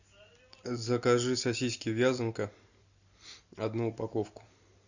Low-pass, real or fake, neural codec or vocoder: 7.2 kHz; real; none